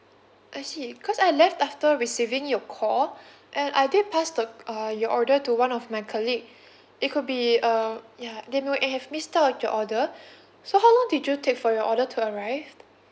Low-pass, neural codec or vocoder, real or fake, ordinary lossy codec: none; none; real; none